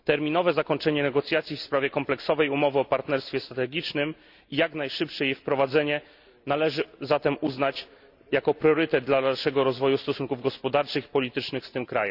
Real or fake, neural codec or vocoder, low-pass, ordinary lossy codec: real; none; 5.4 kHz; none